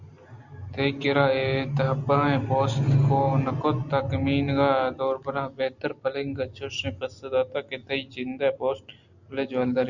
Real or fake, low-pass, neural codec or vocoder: real; 7.2 kHz; none